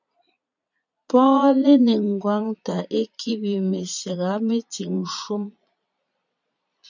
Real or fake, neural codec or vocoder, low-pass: fake; vocoder, 22.05 kHz, 80 mel bands, Vocos; 7.2 kHz